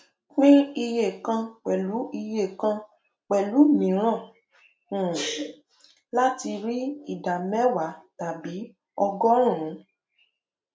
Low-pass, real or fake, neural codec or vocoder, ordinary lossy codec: none; real; none; none